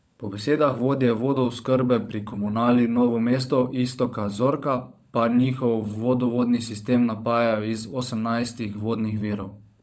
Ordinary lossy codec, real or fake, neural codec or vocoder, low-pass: none; fake; codec, 16 kHz, 16 kbps, FunCodec, trained on LibriTTS, 50 frames a second; none